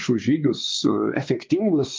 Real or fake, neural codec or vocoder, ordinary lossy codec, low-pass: fake; codec, 16 kHz, 2 kbps, X-Codec, WavLM features, trained on Multilingual LibriSpeech; Opus, 24 kbps; 7.2 kHz